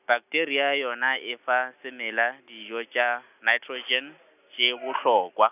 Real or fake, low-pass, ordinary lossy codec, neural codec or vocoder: real; 3.6 kHz; none; none